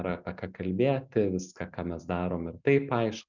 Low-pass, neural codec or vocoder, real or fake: 7.2 kHz; none; real